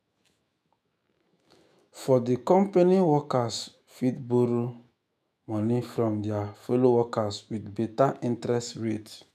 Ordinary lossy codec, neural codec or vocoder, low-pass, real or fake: none; autoencoder, 48 kHz, 128 numbers a frame, DAC-VAE, trained on Japanese speech; 14.4 kHz; fake